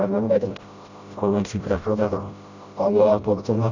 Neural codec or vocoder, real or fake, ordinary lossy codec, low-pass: codec, 16 kHz, 0.5 kbps, FreqCodec, smaller model; fake; AAC, 48 kbps; 7.2 kHz